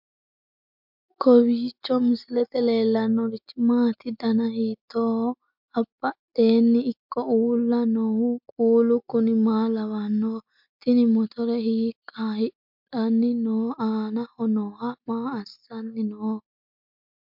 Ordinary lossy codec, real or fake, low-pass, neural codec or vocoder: MP3, 48 kbps; real; 5.4 kHz; none